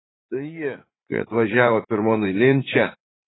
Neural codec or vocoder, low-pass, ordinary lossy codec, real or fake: vocoder, 44.1 kHz, 80 mel bands, Vocos; 7.2 kHz; AAC, 16 kbps; fake